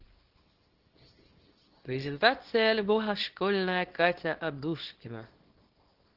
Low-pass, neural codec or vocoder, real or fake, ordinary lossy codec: 5.4 kHz; codec, 24 kHz, 0.9 kbps, WavTokenizer, small release; fake; Opus, 16 kbps